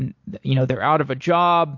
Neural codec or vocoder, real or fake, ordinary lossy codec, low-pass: none; real; MP3, 48 kbps; 7.2 kHz